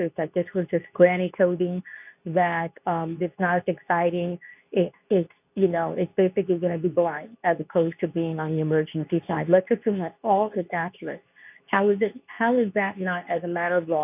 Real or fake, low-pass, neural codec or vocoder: fake; 3.6 kHz; codec, 24 kHz, 0.9 kbps, WavTokenizer, medium speech release version 2